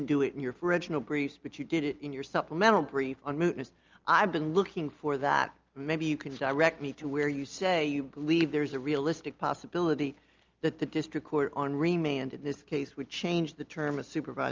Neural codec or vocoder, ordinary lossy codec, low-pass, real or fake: none; Opus, 24 kbps; 7.2 kHz; real